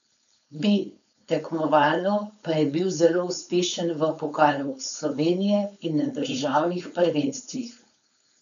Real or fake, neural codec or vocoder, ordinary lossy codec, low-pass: fake; codec, 16 kHz, 4.8 kbps, FACodec; none; 7.2 kHz